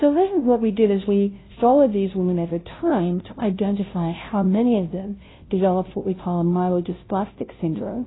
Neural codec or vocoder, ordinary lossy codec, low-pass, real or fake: codec, 16 kHz, 0.5 kbps, FunCodec, trained on Chinese and English, 25 frames a second; AAC, 16 kbps; 7.2 kHz; fake